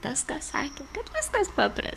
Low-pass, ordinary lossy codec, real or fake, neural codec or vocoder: 14.4 kHz; MP3, 96 kbps; fake; codec, 44.1 kHz, 7.8 kbps, DAC